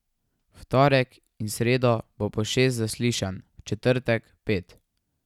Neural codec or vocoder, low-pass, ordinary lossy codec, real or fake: none; 19.8 kHz; none; real